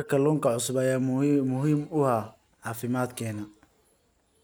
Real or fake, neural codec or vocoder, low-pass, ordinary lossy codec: real; none; none; none